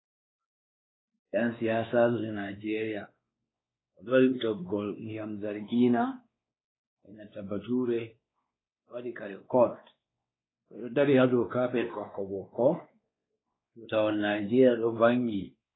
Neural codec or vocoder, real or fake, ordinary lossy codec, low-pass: codec, 16 kHz, 2 kbps, X-Codec, WavLM features, trained on Multilingual LibriSpeech; fake; AAC, 16 kbps; 7.2 kHz